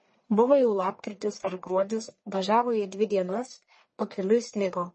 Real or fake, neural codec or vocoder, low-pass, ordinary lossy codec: fake; codec, 44.1 kHz, 1.7 kbps, Pupu-Codec; 10.8 kHz; MP3, 32 kbps